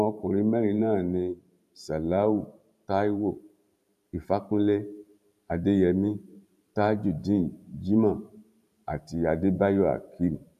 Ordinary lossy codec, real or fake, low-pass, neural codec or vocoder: none; fake; 14.4 kHz; vocoder, 44.1 kHz, 128 mel bands every 512 samples, BigVGAN v2